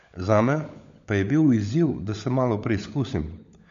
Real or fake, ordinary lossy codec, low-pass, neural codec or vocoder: fake; MP3, 64 kbps; 7.2 kHz; codec, 16 kHz, 16 kbps, FunCodec, trained on LibriTTS, 50 frames a second